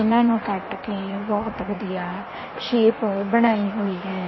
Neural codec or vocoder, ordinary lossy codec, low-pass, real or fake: codec, 16 kHz in and 24 kHz out, 1.1 kbps, FireRedTTS-2 codec; MP3, 24 kbps; 7.2 kHz; fake